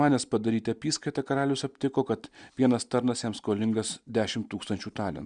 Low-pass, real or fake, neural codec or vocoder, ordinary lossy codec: 9.9 kHz; real; none; Opus, 64 kbps